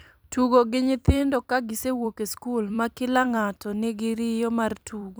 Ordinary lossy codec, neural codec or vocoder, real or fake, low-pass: none; vocoder, 44.1 kHz, 128 mel bands every 256 samples, BigVGAN v2; fake; none